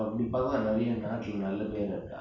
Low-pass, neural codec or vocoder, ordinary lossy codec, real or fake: 7.2 kHz; none; none; real